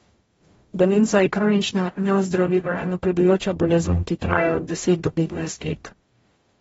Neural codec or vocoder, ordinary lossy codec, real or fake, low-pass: codec, 44.1 kHz, 0.9 kbps, DAC; AAC, 24 kbps; fake; 19.8 kHz